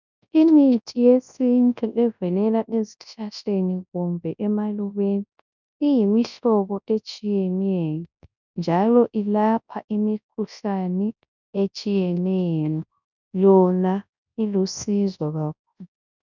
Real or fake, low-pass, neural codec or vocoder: fake; 7.2 kHz; codec, 24 kHz, 0.9 kbps, WavTokenizer, large speech release